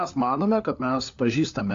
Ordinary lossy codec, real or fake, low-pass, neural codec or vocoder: AAC, 48 kbps; fake; 7.2 kHz; codec, 16 kHz, 4 kbps, FunCodec, trained on LibriTTS, 50 frames a second